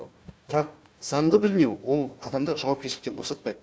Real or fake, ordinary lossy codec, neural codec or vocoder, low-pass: fake; none; codec, 16 kHz, 1 kbps, FunCodec, trained on Chinese and English, 50 frames a second; none